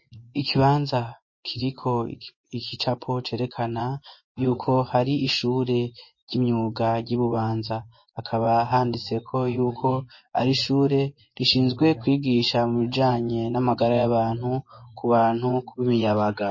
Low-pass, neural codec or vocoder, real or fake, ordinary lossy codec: 7.2 kHz; vocoder, 24 kHz, 100 mel bands, Vocos; fake; MP3, 32 kbps